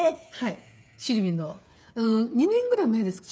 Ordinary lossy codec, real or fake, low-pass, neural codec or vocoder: none; fake; none; codec, 16 kHz, 8 kbps, FreqCodec, smaller model